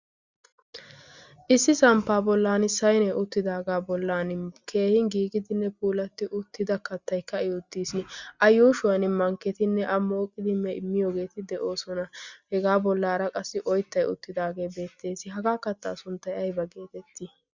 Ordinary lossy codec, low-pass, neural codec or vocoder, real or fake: Opus, 64 kbps; 7.2 kHz; none; real